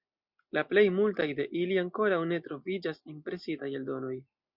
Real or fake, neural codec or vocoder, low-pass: real; none; 5.4 kHz